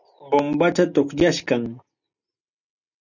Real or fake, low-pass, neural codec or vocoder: real; 7.2 kHz; none